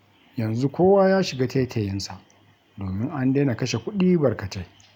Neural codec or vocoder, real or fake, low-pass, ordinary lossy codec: none; real; 19.8 kHz; none